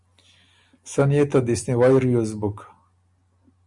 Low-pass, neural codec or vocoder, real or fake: 10.8 kHz; none; real